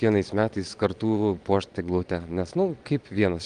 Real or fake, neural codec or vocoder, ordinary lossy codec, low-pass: fake; vocoder, 22.05 kHz, 80 mel bands, Vocos; Opus, 24 kbps; 9.9 kHz